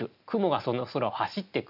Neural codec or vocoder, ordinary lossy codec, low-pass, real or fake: none; none; 5.4 kHz; real